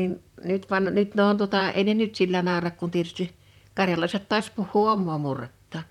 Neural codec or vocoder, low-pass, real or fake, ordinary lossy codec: vocoder, 44.1 kHz, 128 mel bands, Pupu-Vocoder; 19.8 kHz; fake; none